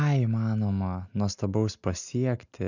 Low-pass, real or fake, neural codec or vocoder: 7.2 kHz; real; none